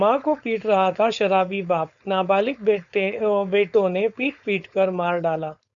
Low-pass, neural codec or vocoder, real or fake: 7.2 kHz; codec, 16 kHz, 4.8 kbps, FACodec; fake